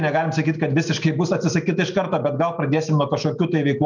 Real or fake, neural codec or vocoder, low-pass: real; none; 7.2 kHz